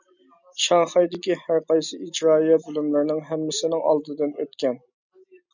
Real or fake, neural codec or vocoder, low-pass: real; none; 7.2 kHz